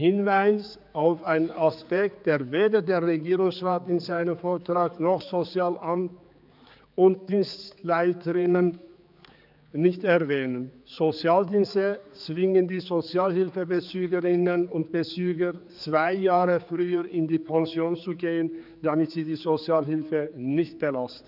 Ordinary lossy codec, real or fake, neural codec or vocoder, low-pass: none; fake; codec, 16 kHz, 4 kbps, X-Codec, HuBERT features, trained on general audio; 5.4 kHz